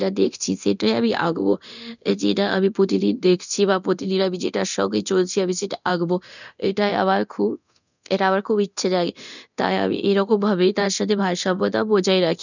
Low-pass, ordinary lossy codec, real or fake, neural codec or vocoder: 7.2 kHz; none; fake; codec, 24 kHz, 0.9 kbps, DualCodec